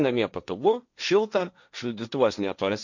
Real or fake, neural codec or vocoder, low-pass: fake; codec, 16 kHz, 1.1 kbps, Voila-Tokenizer; 7.2 kHz